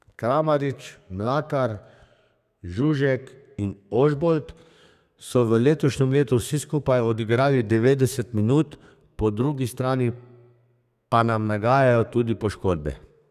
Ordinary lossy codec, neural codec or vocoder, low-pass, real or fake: none; codec, 32 kHz, 1.9 kbps, SNAC; 14.4 kHz; fake